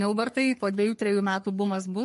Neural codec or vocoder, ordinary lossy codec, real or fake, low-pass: codec, 44.1 kHz, 3.4 kbps, Pupu-Codec; MP3, 48 kbps; fake; 14.4 kHz